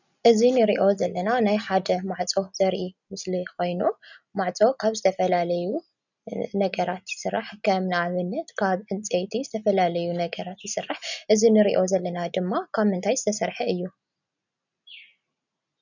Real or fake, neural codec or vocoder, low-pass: real; none; 7.2 kHz